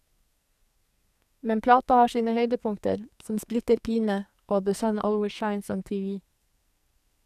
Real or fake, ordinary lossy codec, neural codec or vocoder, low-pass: fake; none; codec, 44.1 kHz, 2.6 kbps, SNAC; 14.4 kHz